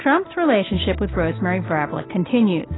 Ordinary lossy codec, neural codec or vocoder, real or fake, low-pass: AAC, 16 kbps; none; real; 7.2 kHz